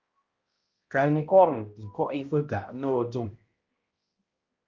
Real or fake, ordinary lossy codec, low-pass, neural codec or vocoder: fake; Opus, 32 kbps; 7.2 kHz; codec, 16 kHz, 0.5 kbps, X-Codec, HuBERT features, trained on balanced general audio